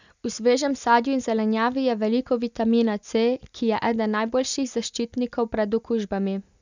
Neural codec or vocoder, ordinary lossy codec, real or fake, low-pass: none; none; real; 7.2 kHz